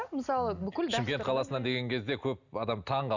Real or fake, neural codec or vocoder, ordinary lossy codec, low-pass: real; none; none; 7.2 kHz